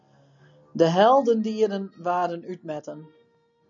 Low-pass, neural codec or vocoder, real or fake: 7.2 kHz; none; real